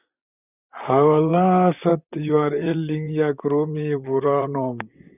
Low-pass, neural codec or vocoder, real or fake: 3.6 kHz; vocoder, 44.1 kHz, 128 mel bands, Pupu-Vocoder; fake